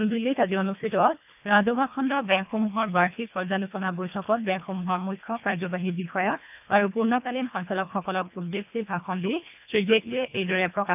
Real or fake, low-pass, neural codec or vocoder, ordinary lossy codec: fake; 3.6 kHz; codec, 24 kHz, 1.5 kbps, HILCodec; none